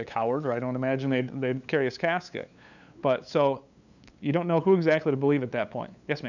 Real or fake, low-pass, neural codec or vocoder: fake; 7.2 kHz; codec, 16 kHz, 8 kbps, FunCodec, trained on LibriTTS, 25 frames a second